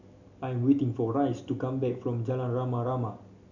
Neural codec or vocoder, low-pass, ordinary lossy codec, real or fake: none; 7.2 kHz; none; real